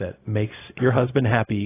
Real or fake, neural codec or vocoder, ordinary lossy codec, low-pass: fake; codec, 16 kHz, 0.4 kbps, LongCat-Audio-Codec; AAC, 16 kbps; 3.6 kHz